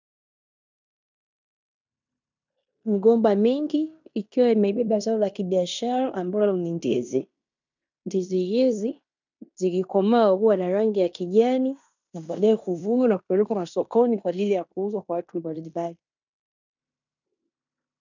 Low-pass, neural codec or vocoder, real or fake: 7.2 kHz; codec, 16 kHz in and 24 kHz out, 0.9 kbps, LongCat-Audio-Codec, fine tuned four codebook decoder; fake